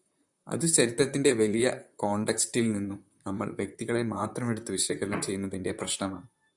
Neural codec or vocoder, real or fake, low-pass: vocoder, 44.1 kHz, 128 mel bands, Pupu-Vocoder; fake; 10.8 kHz